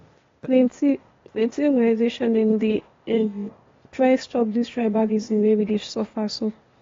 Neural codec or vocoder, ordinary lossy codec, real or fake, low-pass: codec, 16 kHz, 0.8 kbps, ZipCodec; AAC, 32 kbps; fake; 7.2 kHz